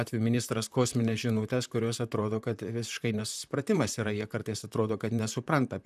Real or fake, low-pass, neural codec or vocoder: fake; 14.4 kHz; vocoder, 44.1 kHz, 128 mel bands, Pupu-Vocoder